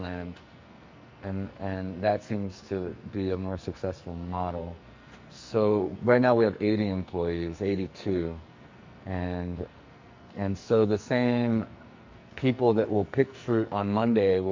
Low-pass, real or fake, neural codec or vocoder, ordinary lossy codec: 7.2 kHz; fake; codec, 44.1 kHz, 2.6 kbps, SNAC; MP3, 48 kbps